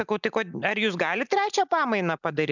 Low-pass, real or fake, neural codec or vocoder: 7.2 kHz; real; none